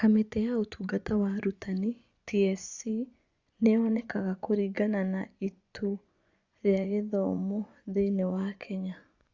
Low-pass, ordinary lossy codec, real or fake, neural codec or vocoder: 7.2 kHz; Opus, 64 kbps; fake; vocoder, 24 kHz, 100 mel bands, Vocos